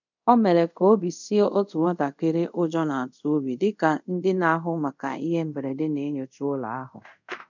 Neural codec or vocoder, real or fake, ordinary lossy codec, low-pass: codec, 24 kHz, 0.5 kbps, DualCodec; fake; none; 7.2 kHz